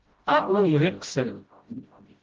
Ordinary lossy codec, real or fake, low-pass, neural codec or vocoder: Opus, 16 kbps; fake; 7.2 kHz; codec, 16 kHz, 0.5 kbps, FreqCodec, smaller model